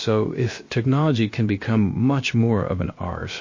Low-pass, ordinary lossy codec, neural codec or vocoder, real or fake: 7.2 kHz; MP3, 32 kbps; codec, 16 kHz, about 1 kbps, DyCAST, with the encoder's durations; fake